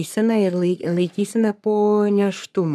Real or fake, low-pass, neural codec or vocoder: fake; 14.4 kHz; codec, 44.1 kHz, 3.4 kbps, Pupu-Codec